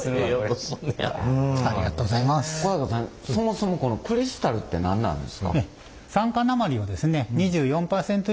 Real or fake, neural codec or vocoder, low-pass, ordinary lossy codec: real; none; none; none